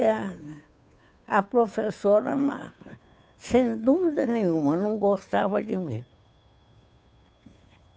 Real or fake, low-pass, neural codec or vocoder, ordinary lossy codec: fake; none; codec, 16 kHz, 2 kbps, FunCodec, trained on Chinese and English, 25 frames a second; none